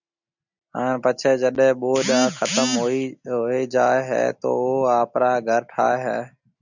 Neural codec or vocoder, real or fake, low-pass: none; real; 7.2 kHz